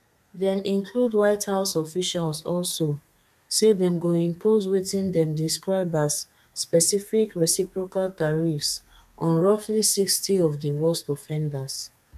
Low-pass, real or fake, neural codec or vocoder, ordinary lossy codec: 14.4 kHz; fake; codec, 32 kHz, 1.9 kbps, SNAC; none